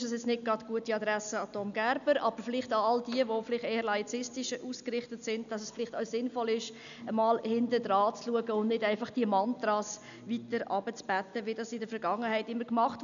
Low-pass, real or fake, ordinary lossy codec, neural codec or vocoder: 7.2 kHz; real; none; none